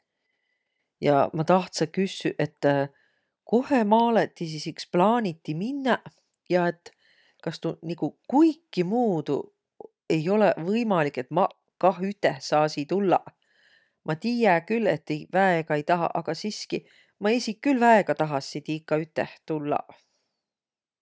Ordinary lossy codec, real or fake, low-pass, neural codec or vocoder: none; real; none; none